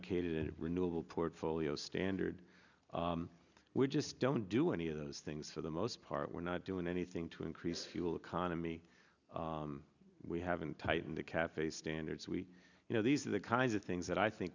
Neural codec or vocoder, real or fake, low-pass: none; real; 7.2 kHz